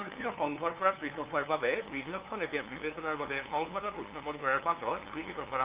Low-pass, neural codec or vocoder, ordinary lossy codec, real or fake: 3.6 kHz; codec, 16 kHz, 2 kbps, FunCodec, trained on LibriTTS, 25 frames a second; Opus, 24 kbps; fake